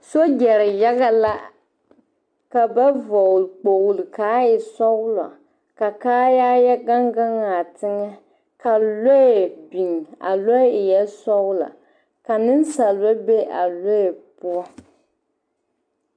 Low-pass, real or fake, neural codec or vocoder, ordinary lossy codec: 9.9 kHz; real; none; MP3, 64 kbps